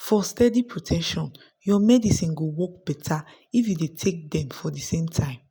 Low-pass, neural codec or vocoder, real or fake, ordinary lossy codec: none; none; real; none